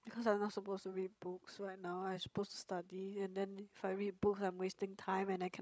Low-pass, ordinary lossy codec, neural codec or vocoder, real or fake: none; none; codec, 16 kHz, 16 kbps, FreqCodec, larger model; fake